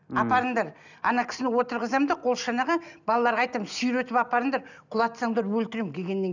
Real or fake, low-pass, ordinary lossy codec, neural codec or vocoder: real; 7.2 kHz; none; none